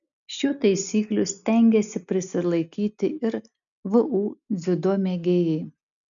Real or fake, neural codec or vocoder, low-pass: real; none; 7.2 kHz